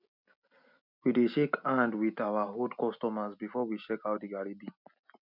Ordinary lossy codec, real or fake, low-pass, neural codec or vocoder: none; real; 5.4 kHz; none